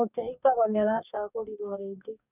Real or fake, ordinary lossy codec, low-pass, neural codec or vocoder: fake; none; 3.6 kHz; codec, 44.1 kHz, 2.6 kbps, DAC